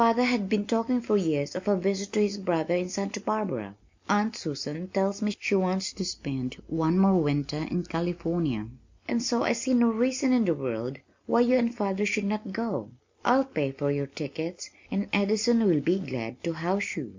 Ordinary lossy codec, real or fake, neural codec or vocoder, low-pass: MP3, 64 kbps; real; none; 7.2 kHz